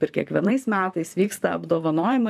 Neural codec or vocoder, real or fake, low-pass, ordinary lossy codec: vocoder, 44.1 kHz, 128 mel bands, Pupu-Vocoder; fake; 14.4 kHz; AAC, 96 kbps